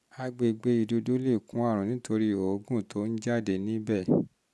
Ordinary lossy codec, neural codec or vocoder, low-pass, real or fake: none; none; none; real